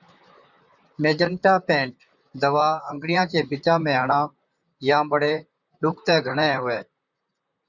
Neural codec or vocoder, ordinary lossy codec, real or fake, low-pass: vocoder, 44.1 kHz, 128 mel bands, Pupu-Vocoder; Opus, 64 kbps; fake; 7.2 kHz